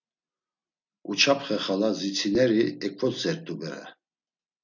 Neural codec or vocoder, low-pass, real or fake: none; 7.2 kHz; real